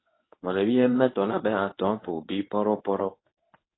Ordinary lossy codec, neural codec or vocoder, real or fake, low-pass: AAC, 16 kbps; codec, 24 kHz, 0.9 kbps, WavTokenizer, medium speech release version 2; fake; 7.2 kHz